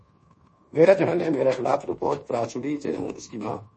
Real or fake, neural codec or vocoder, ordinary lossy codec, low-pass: fake; codec, 24 kHz, 1.2 kbps, DualCodec; MP3, 32 kbps; 10.8 kHz